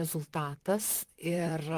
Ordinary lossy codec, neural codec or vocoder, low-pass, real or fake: Opus, 24 kbps; vocoder, 44.1 kHz, 128 mel bands, Pupu-Vocoder; 14.4 kHz; fake